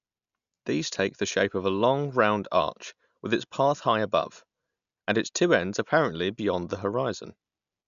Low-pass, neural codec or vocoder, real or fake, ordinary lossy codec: 7.2 kHz; none; real; none